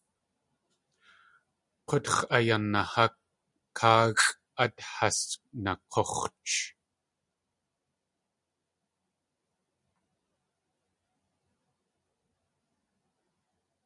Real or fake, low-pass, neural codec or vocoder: real; 10.8 kHz; none